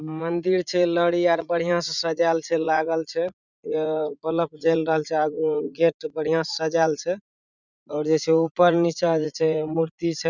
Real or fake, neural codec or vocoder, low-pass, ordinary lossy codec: fake; vocoder, 44.1 kHz, 80 mel bands, Vocos; 7.2 kHz; none